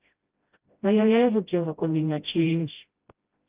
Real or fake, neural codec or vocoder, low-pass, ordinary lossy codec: fake; codec, 16 kHz, 0.5 kbps, FreqCodec, smaller model; 3.6 kHz; Opus, 32 kbps